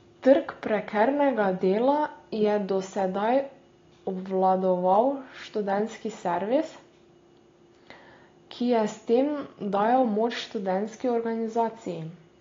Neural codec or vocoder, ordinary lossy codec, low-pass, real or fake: none; AAC, 32 kbps; 7.2 kHz; real